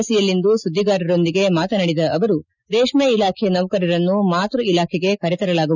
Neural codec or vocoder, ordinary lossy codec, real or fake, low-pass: none; none; real; 7.2 kHz